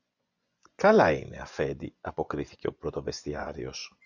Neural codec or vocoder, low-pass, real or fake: vocoder, 24 kHz, 100 mel bands, Vocos; 7.2 kHz; fake